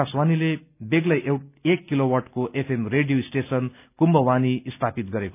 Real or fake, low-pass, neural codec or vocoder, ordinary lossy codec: real; 3.6 kHz; none; none